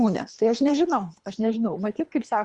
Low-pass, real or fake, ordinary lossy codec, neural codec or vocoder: 10.8 kHz; fake; Opus, 64 kbps; codec, 24 kHz, 3 kbps, HILCodec